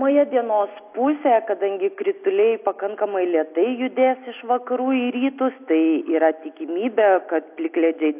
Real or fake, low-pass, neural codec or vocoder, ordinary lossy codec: real; 3.6 kHz; none; AAC, 32 kbps